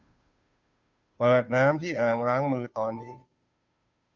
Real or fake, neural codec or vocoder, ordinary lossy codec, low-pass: fake; codec, 16 kHz, 2 kbps, FunCodec, trained on Chinese and English, 25 frames a second; Opus, 64 kbps; 7.2 kHz